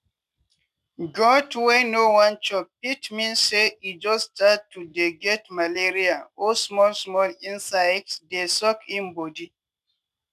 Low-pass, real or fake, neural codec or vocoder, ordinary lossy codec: 14.4 kHz; real; none; none